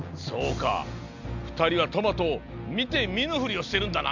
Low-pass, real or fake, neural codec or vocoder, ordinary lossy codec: 7.2 kHz; real; none; none